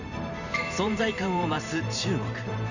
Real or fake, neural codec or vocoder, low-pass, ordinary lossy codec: fake; vocoder, 44.1 kHz, 128 mel bands every 512 samples, BigVGAN v2; 7.2 kHz; none